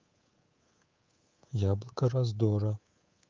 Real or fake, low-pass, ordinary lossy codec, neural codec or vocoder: fake; 7.2 kHz; Opus, 32 kbps; codec, 24 kHz, 3.1 kbps, DualCodec